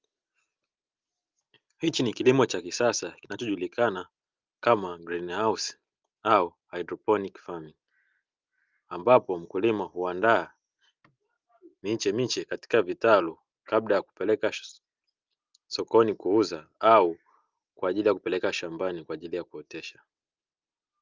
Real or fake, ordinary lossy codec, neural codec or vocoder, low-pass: real; Opus, 24 kbps; none; 7.2 kHz